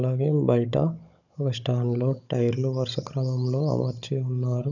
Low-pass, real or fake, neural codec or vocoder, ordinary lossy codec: 7.2 kHz; fake; codec, 16 kHz, 16 kbps, FunCodec, trained on Chinese and English, 50 frames a second; none